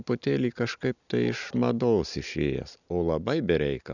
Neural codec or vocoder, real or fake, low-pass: none; real; 7.2 kHz